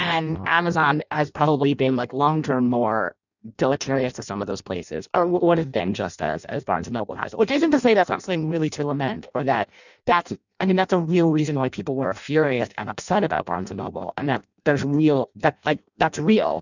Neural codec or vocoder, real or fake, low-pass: codec, 16 kHz in and 24 kHz out, 0.6 kbps, FireRedTTS-2 codec; fake; 7.2 kHz